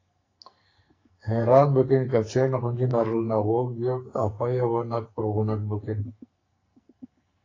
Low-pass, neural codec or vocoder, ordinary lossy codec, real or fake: 7.2 kHz; codec, 44.1 kHz, 2.6 kbps, SNAC; AAC, 32 kbps; fake